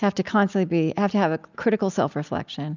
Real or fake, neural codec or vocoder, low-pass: real; none; 7.2 kHz